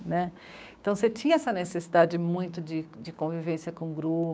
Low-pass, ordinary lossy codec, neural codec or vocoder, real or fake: none; none; codec, 16 kHz, 6 kbps, DAC; fake